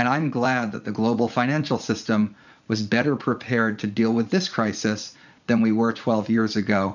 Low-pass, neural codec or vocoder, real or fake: 7.2 kHz; vocoder, 44.1 kHz, 80 mel bands, Vocos; fake